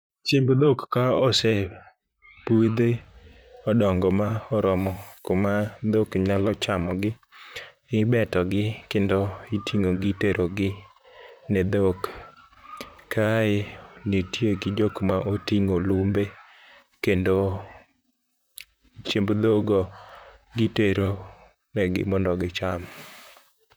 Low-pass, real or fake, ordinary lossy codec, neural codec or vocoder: none; fake; none; vocoder, 44.1 kHz, 128 mel bands, Pupu-Vocoder